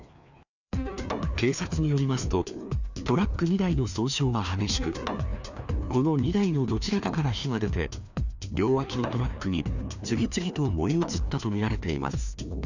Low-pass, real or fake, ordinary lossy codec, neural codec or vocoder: 7.2 kHz; fake; none; codec, 16 kHz, 2 kbps, FreqCodec, larger model